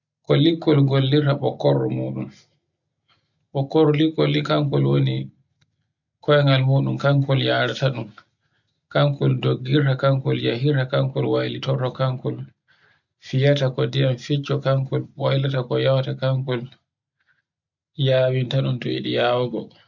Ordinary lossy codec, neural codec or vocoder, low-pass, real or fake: none; none; 7.2 kHz; real